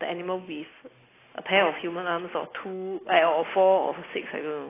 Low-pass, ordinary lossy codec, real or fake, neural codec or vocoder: 3.6 kHz; AAC, 16 kbps; real; none